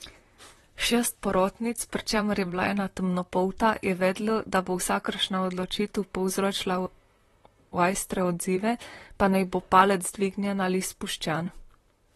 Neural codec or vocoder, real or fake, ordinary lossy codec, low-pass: vocoder, 44.1 kHz, 128 mel bands, Pupu-Vocoder; fake; AAC, 32 kbps; 19.8 kHz